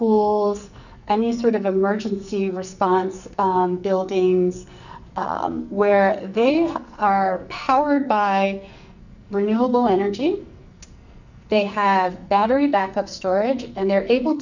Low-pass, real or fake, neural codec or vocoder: 7.2 kHz; fake; codec, 44.1 kHz, 2.6 kbps, SNAC